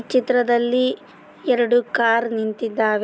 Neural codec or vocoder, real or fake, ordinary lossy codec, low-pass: none; real; none; none